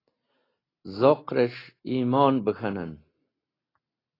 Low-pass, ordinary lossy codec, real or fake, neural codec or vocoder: 5.4 kHz; AAC, 24 kbps; real; none